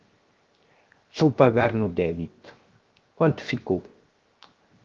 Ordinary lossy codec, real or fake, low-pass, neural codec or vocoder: Opus, 32 kbps; fake; 7.2 kHz; codec, 16 kHz, 0.7 kbps, FocalCodec